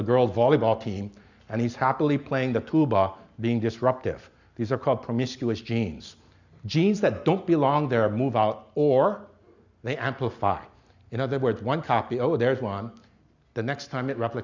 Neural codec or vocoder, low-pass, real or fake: none; 7.2 kHz; real